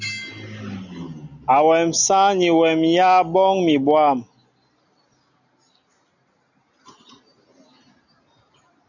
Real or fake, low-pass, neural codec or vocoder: real; 7.2 kHz; none